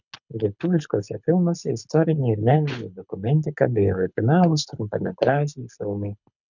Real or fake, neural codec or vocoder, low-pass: fake; codec, 24 kHz, 6 kbps, HILCodec; 7.2 kHz